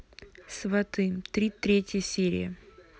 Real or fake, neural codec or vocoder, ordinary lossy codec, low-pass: real; none; none; none